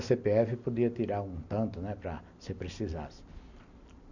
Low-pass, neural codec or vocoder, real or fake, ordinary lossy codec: 7.2 kHz; none; real; none